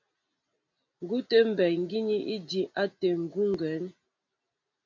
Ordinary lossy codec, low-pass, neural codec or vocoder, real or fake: MP3, 48 kbps; 7.2 kHz; none; real